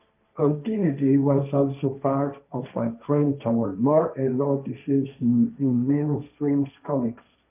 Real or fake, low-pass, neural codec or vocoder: fake; 3.6 kHz; codec, 16 kHz, 1.1 kbps, Voila-Tokenizer